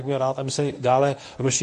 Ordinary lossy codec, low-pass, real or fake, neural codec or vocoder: AAC, 48 kbps; 10.8 kHz; fake; codec, 24 kHz, 0.9 kbps, WavTokenizer, medium speech release version 1